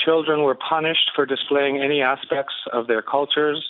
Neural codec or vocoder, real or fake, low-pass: none; real; 5.4 kHz